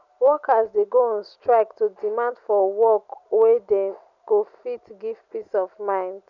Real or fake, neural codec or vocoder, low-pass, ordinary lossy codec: real; none; 7.2 kHz; none